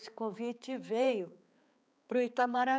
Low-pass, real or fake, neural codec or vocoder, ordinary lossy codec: none; fake; codec, 16 kHz, 4 kbps, X-Codec, HuBERT features, trained on balanced general audio; none